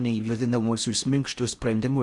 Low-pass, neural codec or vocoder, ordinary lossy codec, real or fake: 10.8 kHz; codec, 16 kHz in and 24 kHz out, 0.6 kbps, FocalCodec, streaming, 4096 codes; Opus, 64 kbps; fake